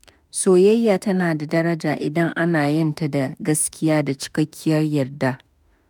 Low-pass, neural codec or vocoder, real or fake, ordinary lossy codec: none; autoencoder, 48 kHz, 32 numbers a frame, DAC-VAE, trained on Japanese speech; fake; none